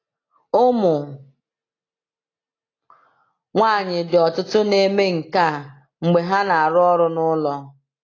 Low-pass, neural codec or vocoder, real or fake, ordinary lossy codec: 7.2 kHz; none; real; AAC, 32 kbps